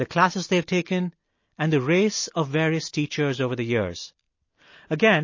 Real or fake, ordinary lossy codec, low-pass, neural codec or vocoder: real; MP3, 32 kbps; 7.2 kHz; none